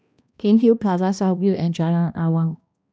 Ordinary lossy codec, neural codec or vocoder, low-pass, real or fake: none; codec, 16 kHz, 1 kbps, X-Codec, HuBERT features, trained on balanced general audio; none; fake